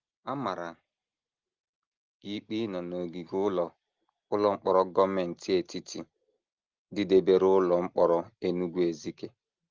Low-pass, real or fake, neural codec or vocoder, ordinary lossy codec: 7.2 kHz; real; none; Opus, 32 kbps